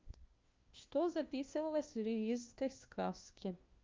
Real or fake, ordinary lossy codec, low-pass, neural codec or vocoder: fake; Opus, 24 kbps; 7.2 kHz; codec, 16 kHz, 1 kbps, FunCodec, trained on LibriTTS, 50 frames a second